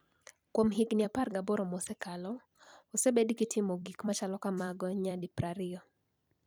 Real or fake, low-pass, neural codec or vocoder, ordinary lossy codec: fake; 19.8 kHz; vocoder, 44.1 kHz, 128 mel bands every 256 samples, BigVGAN v2; none